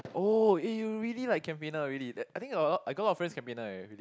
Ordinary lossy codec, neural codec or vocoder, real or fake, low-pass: none; none; real; none